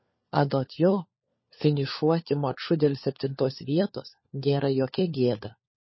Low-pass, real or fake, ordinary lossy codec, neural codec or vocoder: 7.2 kHz; fake; MP3, 24 kbps; codec, 16 kHz, 4 kbps, FunCodec, trained on LibriTTS, 50 frames a second